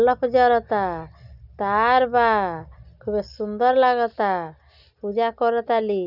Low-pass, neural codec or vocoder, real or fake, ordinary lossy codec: 5.4 kHz; none; real; none